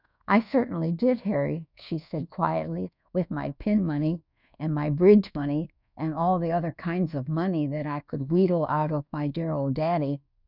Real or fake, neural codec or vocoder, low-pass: fake; codec, 24 kHz, 1.2 kbps, DualCodec; 5.4 kHz